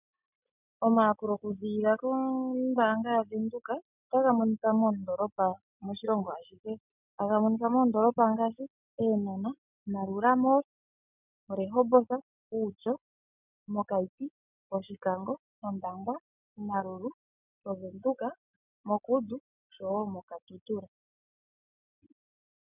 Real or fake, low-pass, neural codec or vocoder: real; 3.6 kHz; none